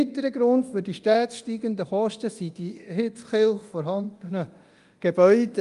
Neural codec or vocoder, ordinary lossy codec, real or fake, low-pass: codec, 24 kHz, 0.9 kbps, DualCodec; Opus, 32 kbps; fake; 10.8 kHz